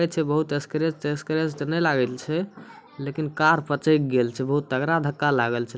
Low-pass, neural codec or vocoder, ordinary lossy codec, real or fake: none; none; none; real